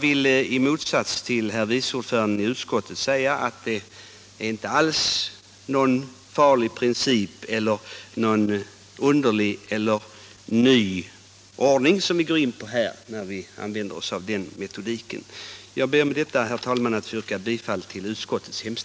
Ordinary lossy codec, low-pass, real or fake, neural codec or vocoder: none; none; real; none